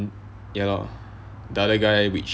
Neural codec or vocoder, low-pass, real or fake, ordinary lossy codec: none; none; real; none